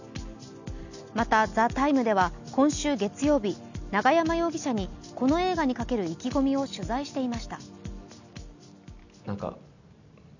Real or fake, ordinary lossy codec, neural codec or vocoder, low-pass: real; none; none; 7.2 kHz